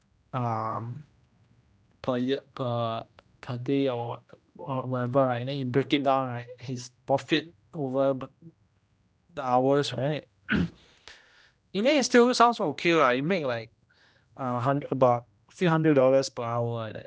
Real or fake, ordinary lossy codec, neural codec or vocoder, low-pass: fake; none; codec, 16 kHz, 1 kbps, X-Codec, HuBERT features, trained on general audio; none